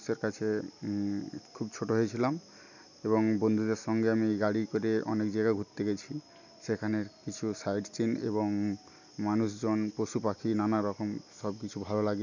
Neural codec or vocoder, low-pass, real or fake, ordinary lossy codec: none; 7.2 kHz; real; none